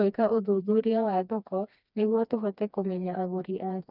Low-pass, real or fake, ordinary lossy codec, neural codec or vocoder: 5.4 kHz; fake; none; codec, 16 kHz, 2 kbps, FreqCodec, smaller model